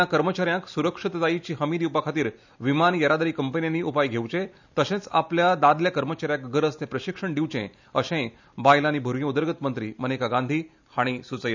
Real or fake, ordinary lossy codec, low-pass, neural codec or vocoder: real; none; 7.2 kHz; none